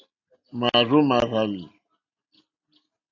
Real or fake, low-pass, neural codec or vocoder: real; 7.2 kHz; none